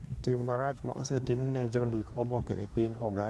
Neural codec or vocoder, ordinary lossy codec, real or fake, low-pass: codec, 24 kHz, 1 kbps, SNAC; none; fake; none